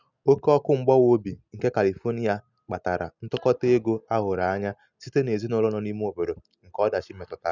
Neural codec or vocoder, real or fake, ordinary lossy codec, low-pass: none; real; none; 7.2 kHz